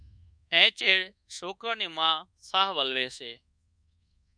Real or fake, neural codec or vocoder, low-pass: fake; codec, 24 kHz, 1.2 kbps, DualCodec; 9.9 kHz